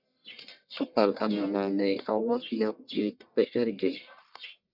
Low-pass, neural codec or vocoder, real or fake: 5.4 kHz; codec, 44.1 kHz, 1.7 kbps, Pupu-Codec; fake